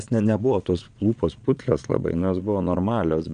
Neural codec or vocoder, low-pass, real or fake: vocoder, 22.05 kHz, 80 mel bands, Vocos; 9.9 kHz; fake